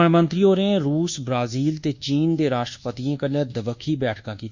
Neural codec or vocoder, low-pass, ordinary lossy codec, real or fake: codec, 24 kHz, 1.2 kbps, DualCodec; 7.2 kHz; Opus, 64 kbps; fake